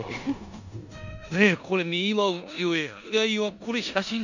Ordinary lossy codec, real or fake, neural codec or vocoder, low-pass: none; fake; codec, 16 kHz in and 24 kHz out, 0.9 kbps, LongCat-Audio-Codec, four codebook decoder; 7.2 kHz